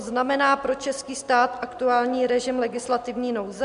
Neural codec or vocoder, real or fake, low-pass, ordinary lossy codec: none; real; 10.8 kHz; MP3, 64 kbps